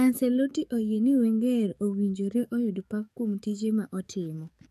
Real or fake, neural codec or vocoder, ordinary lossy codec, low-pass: fake; codec, 44.1 kHz, 7.8 kbps, DAC; none; 14.4 kHz